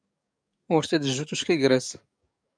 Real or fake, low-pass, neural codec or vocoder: fake; 9.9 kHz; codec, 44.1 kHz, 7.8 kbps, DAC